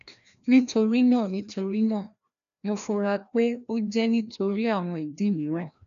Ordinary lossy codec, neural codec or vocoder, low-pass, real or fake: none; codec, 16 kHz, 1 kbps, FreqCodec, larger model; 7.2 kHz; fake